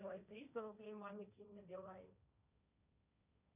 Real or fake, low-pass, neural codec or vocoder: fake; 3.6 kHz; codec, 16 kHz, 1.1 kbps, Voila-Tokenizer